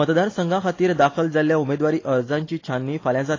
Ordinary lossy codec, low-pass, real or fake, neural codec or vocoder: AAC, 32 kbps; 7.2 kHz; real; none